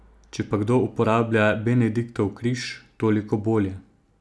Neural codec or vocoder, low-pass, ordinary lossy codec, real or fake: none; none; none; real